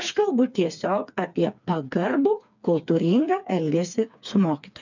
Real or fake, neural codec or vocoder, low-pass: fake; codec, 16 kHz, 4 kbps, FreqCodec, smaller model; 7.2 kHz